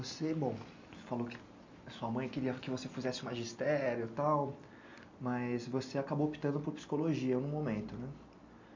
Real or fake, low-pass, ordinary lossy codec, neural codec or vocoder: real; 7.2 kHz; MP3, 48 kbps; none